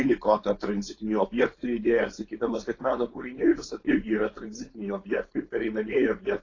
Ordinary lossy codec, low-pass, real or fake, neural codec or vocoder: AAC, 32 kbps; 7.2 kHz; fake; codec, 16 kHz, 4.8 kbps, FACodec